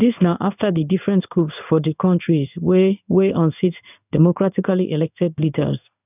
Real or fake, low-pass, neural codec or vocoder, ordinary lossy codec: fake; 3.6 kHz; codec, 16 kHz in and 24 kHz out, 1 kbps, XY-Tokenizer; none